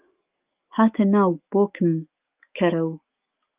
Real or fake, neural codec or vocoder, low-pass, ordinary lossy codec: fake; autoencoder, 48 kHz, 128 numbers a frame, DAC-VAE, trained on Japanese speech; 3.6 kHz; Opus, 24 kbps